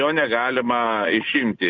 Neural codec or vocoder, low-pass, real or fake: none; 7.2 kHz; real